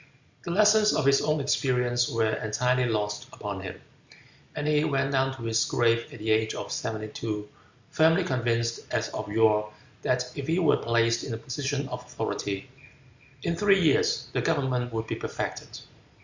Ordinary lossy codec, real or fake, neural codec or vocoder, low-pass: Opus, 64 kbps; real; none; 7.2 kHz